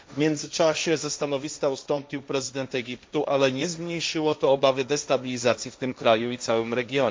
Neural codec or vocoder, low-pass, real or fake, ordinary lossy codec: codec, 16 kHz, 1.1 kbps, Voila-Tokenizer; none; fake; none